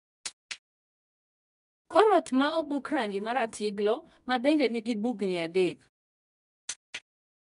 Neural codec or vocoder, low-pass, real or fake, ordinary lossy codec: codec, 24 kHz, 0.9 kbps, WavTokenizer, medium music audio release; 10.8 kHz; fake; none